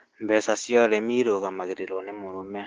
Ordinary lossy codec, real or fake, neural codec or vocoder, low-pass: Opus, 16 kbps; fake; codec, 16 kHz, 6 kbps, DAC; 7.2 kHz